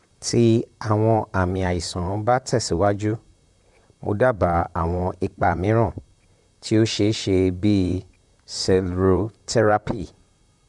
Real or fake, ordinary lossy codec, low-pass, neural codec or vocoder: fake; none; 10.8 kHz; vocoder, 44.1 kHz, 128 mel bands, Pupu-Vocoder